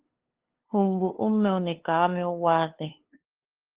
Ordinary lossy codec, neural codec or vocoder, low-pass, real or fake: Opus, 16 kbps; codec, 16 kHz, 2 kbps, FunCodec, trained on LibriTTS, 25 frames a second; 3.6 kHz; fake